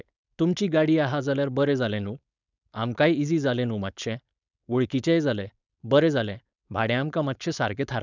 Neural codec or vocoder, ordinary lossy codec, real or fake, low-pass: codec, 16 kHz, 4.8 kbps, FACodec; none; fake; 7.2 kHz